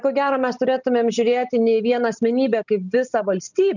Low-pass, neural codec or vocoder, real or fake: 7.2 kHz; none; real